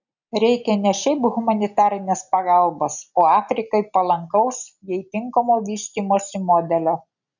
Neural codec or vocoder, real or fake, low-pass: none; real; 7.2 kHz